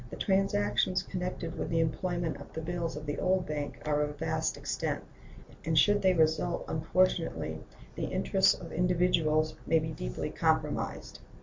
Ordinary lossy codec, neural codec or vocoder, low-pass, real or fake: MP3, 48 kbps; none; 7.2 kHz; real